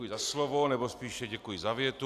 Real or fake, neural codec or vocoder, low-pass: real; none; 14.4 kHz